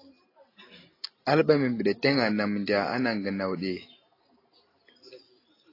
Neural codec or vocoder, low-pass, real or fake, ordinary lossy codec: none; 5.4 kHz; real; AAC, 24 kbps